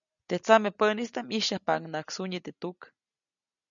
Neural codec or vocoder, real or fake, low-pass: none; real; 7.2 kHz